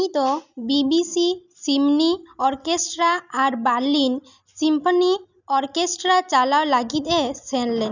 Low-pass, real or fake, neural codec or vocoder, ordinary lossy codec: 7.2 kHz; real; none; none